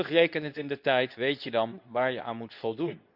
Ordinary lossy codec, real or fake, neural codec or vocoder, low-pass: none; fake; codec, 24 kHz, 0.9 kbps, WavTokenizer, medium speech release version 2; 5.4 kHz